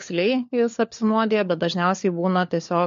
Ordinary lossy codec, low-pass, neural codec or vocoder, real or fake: MP3, 48 kbps; 7.2 kHz; codec, 16 kHz, 4 kbps, FunCodec, trained on LibriTTS, 50 frames a second; fake